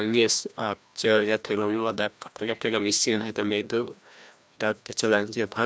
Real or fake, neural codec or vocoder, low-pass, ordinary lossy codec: fake; codec, 16 kHz, 1 kbps, FreqCodec, larger model; none; none